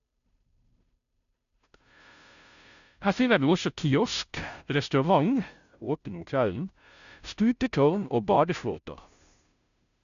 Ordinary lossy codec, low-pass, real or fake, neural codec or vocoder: none; 7.2 kHz; fake; codec, 16 kHz, 0.5 kbps, FunCodec, trained on Chinese and English, 25 frames a second